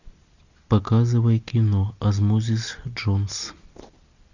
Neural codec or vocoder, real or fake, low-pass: none; real; 7.2 kHz